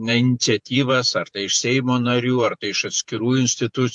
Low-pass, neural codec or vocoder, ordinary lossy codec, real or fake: 10.8 kHz; vocoder, 48 kHz, 128 mel bands, Vocos; MP3, 64 kbps; fake